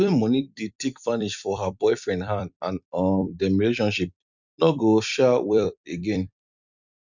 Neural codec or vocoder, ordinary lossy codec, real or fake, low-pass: vocoder, 44.1 kHz, 128 mel bands every 256 samples, BigVGAN v2; none; fake; 7.2 kHz